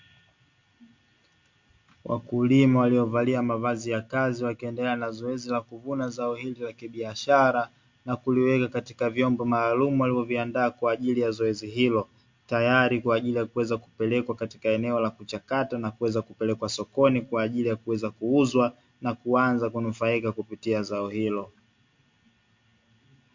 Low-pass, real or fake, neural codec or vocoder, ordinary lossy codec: 7.2 kHz; real; none; MP3, 48 kbps